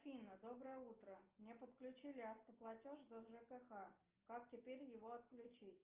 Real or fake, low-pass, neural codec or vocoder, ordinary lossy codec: real; 3.6 kHz; none; Opus, 24 kbps